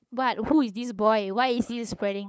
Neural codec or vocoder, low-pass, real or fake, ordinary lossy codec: codec, 16 kHz, 8 kbps, FunCodec, trained on LibriTTS, 25 frames a second; none; fake; none